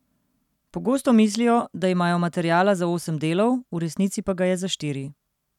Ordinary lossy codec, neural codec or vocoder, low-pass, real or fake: none; none; 19.8 kHz; real